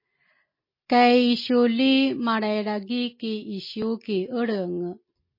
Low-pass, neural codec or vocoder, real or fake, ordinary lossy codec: 5.4 kHz; none; real; MP3, 24 kbps